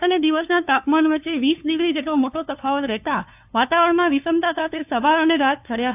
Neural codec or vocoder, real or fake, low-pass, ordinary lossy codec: codec, 16 kHz, 2 kbps, FunCodec, trained on LibriTTS, 25 frames a second; fake; 3.6 kHz; AAC, 32 kbps